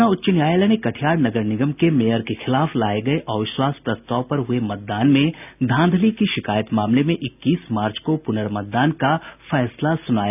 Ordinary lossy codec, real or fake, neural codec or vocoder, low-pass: none; real; none; 3.6 kHz